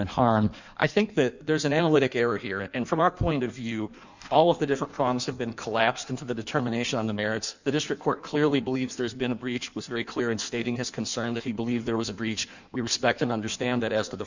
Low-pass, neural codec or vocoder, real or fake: 7.2 kHz; codec, 16 kHz in and 24 kHz out, 1.1 kbps, FireRedTTS-2 codec; fake